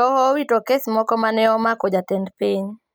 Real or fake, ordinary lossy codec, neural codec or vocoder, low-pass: real; none; none; none